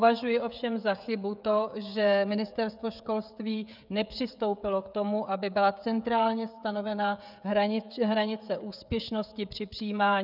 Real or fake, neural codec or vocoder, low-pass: fake; codec, 16 kHz, 16 kbps, FreqCodec, smaller model; 5.4 kHz